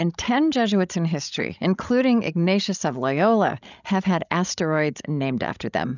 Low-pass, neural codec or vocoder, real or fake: 7.2 kHz; codec, 16 kHz, 16 kbps, FreqCodec, larger model; fake